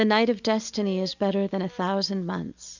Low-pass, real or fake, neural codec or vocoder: 7.2 kHz; real; none